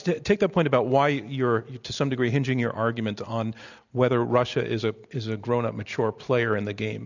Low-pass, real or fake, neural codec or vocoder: 7.2 kHz; real; none